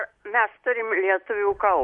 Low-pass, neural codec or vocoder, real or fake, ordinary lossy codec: 10.8 kHz; none; real; MP3, 48 kbps